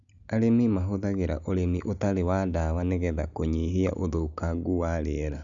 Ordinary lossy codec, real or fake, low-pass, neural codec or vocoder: none; real; 7.2 kHz; none